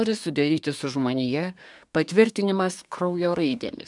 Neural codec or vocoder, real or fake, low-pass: codec, 24 kHz, 1 kbps, SNAC; fake; 10.8 kHz